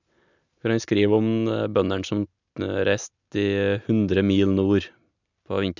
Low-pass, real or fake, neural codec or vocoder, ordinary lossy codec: 7.2 kHz; real; none; none